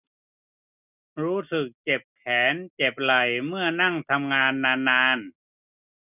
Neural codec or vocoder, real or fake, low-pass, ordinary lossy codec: none; real; 3.6 kHz; none